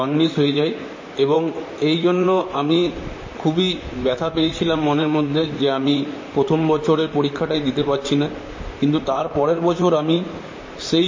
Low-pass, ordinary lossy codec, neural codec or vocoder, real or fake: 7.2 kHz; MP3, 32 kbps; vocoder, 44.1 kHz, 128 mel bands, Pupu-Vocoder; fake